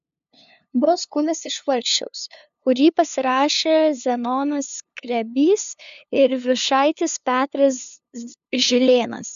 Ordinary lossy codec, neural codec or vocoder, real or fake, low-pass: AAC, 96 kbps; codec, 16 kHz, 2 kbps, FunCodec, trained on LibriTTS, 25 frames a second; fake; 7.2 kHz